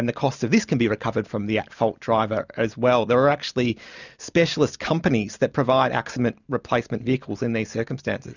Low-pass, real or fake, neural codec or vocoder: 7.2 kHz; real; none